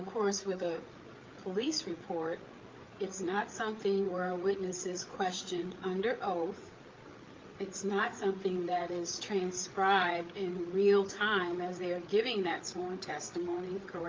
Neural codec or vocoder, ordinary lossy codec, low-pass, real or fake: codec, 16 kHz, 8 kbps, FreqCodec, larger model; Opus, 32 kbps; 7.2 kHz; fake